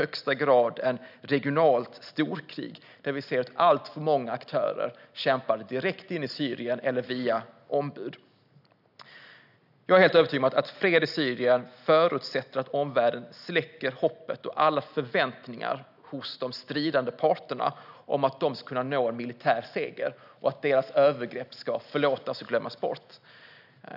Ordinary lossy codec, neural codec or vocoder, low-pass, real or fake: none; none; 5.4 kHz; real